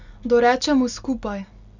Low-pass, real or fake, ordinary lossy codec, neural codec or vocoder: 7.2 kHz; real; none; none